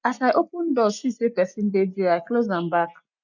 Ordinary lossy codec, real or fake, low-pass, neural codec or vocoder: none; real; 7.2 kHz; none